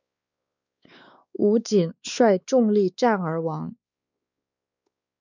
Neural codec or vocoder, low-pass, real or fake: codec, 16 kHz, 2 kbps, X-Codec, WavLM features, trained on Multilingual LibriSpeech; 7.2 kHz; fake